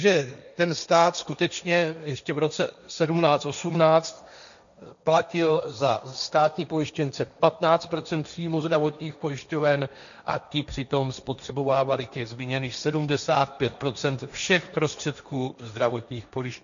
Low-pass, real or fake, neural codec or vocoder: 7.2 kHz; fake; codec, 16 kHz, 1.1 kbps, Voila-Tokenizer